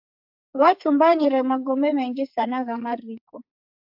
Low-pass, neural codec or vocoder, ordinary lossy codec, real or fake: 5.4 kHz; codec, 44.1 kHz, 2.6 kbps, SNAC; AAC, 48 kbps; fake